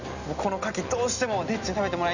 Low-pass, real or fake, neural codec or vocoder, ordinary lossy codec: 7.2 kHz; real; none; none